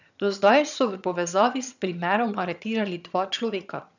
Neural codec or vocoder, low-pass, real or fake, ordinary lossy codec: vocoder, 22.05 kHz, 80 mel bands, HiFi-GAN; 7.2 kHz; fake; none